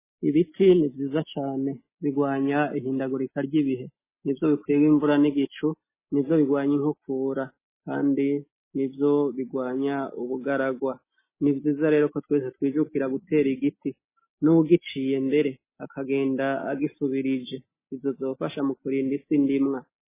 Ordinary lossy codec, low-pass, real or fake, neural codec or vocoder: MP3, 16 kbps; 3.6 kHz; real; none